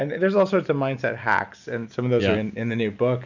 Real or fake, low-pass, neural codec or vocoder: real; 7.2 kHz; none